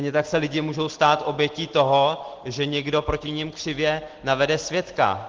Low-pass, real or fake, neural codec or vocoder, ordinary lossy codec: 7.2 kHz; real; none; Opus, 16 kbps